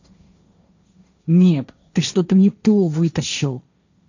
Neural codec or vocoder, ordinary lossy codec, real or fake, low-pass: codec, 16 kHz, 1.1 kbps, Voila-Tokenizer; none; fake; 7.2 kHz